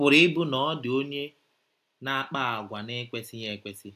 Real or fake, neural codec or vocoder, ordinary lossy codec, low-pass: real; none; AAC, 96 kbps; 14.4 kHz